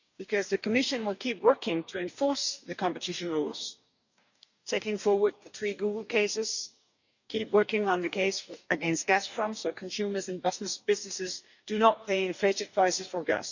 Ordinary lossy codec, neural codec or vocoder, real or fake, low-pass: none; codec, 44.1 kHz, 2.6 kbps, DAC; fake; 7.2 kHz